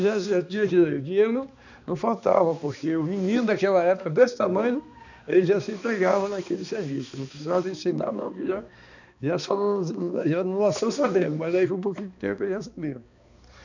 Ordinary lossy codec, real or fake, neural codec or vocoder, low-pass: none; fake; codec, 16 kHz, 2 kbps, X-Codec, HuBERT features, trained on balanced general audio; 7.2 kHz